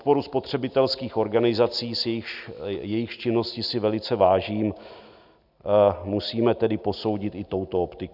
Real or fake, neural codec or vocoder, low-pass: real; none; 5.4 kHz